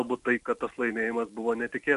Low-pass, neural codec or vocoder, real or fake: 10.8 kHz; none; real